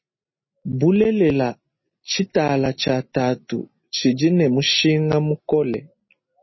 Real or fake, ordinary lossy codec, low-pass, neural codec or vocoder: real; MP3, 24 kbps; 7.2 kHz; none